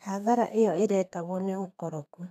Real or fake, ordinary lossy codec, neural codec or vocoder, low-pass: fake; none; codec, 32 kHz, 1.9 kbps, SNAC; 14.4 kHz